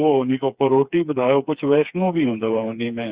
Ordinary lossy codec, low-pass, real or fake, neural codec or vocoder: Opus, 64 kbps; 3.6 kHz; fake; codec, 16 kHz, 4 kbps, FreqCodec, smaller model